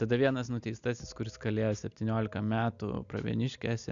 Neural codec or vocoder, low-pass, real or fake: none; 7.2 kHz; real